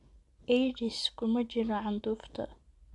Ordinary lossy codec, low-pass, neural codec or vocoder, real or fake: AAC, 64 kbps; 10.8 kHz; none; real